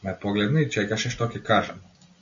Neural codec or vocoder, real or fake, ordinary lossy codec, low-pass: none; real; AAC, 64 kbps; 7.2 kHz